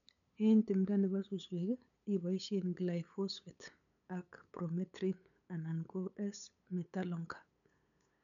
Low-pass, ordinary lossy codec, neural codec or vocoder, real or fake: 7.2 kHz; none; codec, 16 kHz, 8 kbps, FunCodec, trained on LibriTTS, 25 frames a second; fake